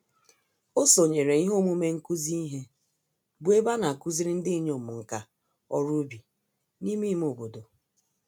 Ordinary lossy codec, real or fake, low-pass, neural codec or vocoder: none; real; none; none